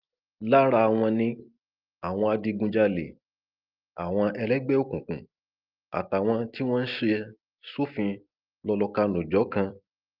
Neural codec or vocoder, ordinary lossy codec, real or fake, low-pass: none; Opus, 32 kbps; real; 5.4 kHz